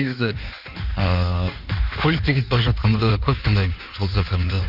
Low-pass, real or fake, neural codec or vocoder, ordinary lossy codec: 5.4 kHz; fake; codec, 16 kHz in and 24 kHz out, 1.1 kbps, FireRedTTS-2 codec; none